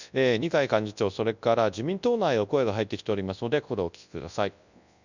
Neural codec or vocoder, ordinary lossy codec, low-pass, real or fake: codec, 24 kHz, 0.9 kbps, WavTokenizer, large speech release; none; 7.2 kHz; fake